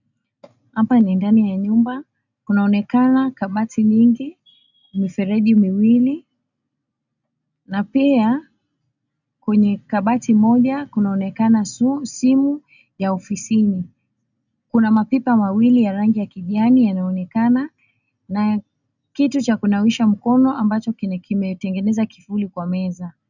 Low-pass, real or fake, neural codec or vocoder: 7.2 kHz; real; none